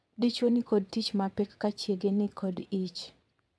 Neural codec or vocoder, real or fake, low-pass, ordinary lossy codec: vocoder, 22.05 kHz, 80 mel bands, WaveNeXt; fake; none; none